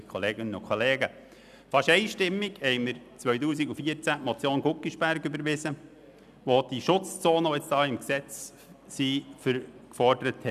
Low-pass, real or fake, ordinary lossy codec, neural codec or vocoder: 14.4 kHz; real; none; none